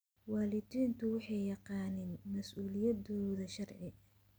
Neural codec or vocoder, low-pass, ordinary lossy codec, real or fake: none; none; none; real